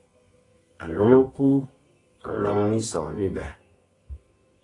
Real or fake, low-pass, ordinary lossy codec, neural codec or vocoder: fake; 10.8 kHz; AAC, 32 kbps; codec, 24 kHz, 0.9 kbps, WavTokenizer, medium music audio release